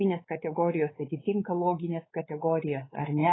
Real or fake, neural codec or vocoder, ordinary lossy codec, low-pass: fake; codec, 16 kHz, 4 kbps, X-Codec, WavLM features, trained on Multilingual LibriSpeech; AAC, 16 kbps; 7.2 kHz